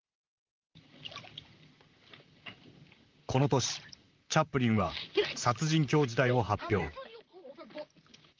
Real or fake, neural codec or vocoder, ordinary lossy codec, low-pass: fake; vocoder, 44.1 kHz, 128 mel bands, Pupu-Vocoder; Opus, 24 kbps; 7.2 kHz